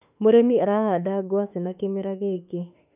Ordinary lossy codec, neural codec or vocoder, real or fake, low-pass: none; autoencoder, 48 kHz, 32 numbers a frame, DAC-VAE, trained on Japanese speech; fake; 3.6 kHz